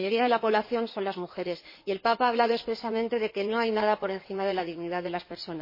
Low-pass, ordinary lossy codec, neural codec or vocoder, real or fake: 5.4 kHz; MP3, 24 kbps; codec, 16 kHz in and 24 kHz out, 2.2 kbps, FireRedTTS-2 codec; fake